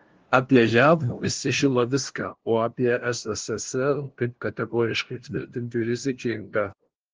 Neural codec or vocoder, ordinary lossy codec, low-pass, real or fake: codec, 16 kHz, 0.5 kbps, FunCodec, trained on LibriTTS, 25 frames a second; Opus, 16 kbps; 7.2 kHz; fake